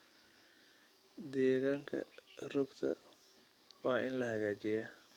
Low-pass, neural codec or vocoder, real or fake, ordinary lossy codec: 19.8 kHz; codec, 44.1 kHz, 7.8 kbps, DAC; fake; none